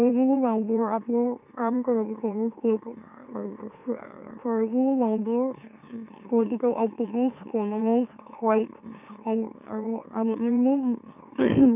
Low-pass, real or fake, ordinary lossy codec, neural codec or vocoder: 3.6 kHz; fake; none; autoencoder, 44.1 kHz, a latent of 192 numbers a frame, MeloTTS